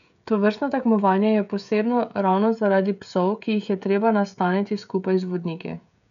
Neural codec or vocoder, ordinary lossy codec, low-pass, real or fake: codec, 16 kHz, 16 kbps, FreqCodec, smaller model; none; 7.2 kHz; fake